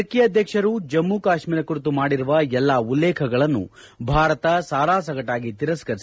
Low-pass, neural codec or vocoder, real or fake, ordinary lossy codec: none; none; real; none